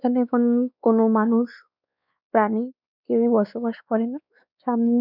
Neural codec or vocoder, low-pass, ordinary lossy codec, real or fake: codec, 16 kHz, 2 kbps, X-Codec, WavLM features, trained on Multilingual LibriSpeech; 5.4 kHz; none; fake